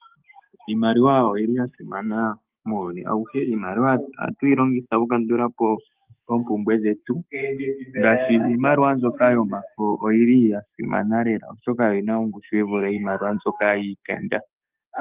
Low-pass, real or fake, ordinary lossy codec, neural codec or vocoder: 3.6 kHz; fake; Opus, 24 kbps; codec, 44.1 kHz, 7.8 kbps, DAC